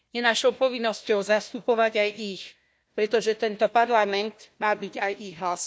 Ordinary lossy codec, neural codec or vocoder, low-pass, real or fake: none; codec, 16 kHz, 1 kbps, FunCodec, trained on Chinese and English, 50 frames a second; none; fake